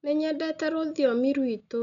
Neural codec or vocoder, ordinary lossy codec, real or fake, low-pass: none; none; real; 7.2 kHz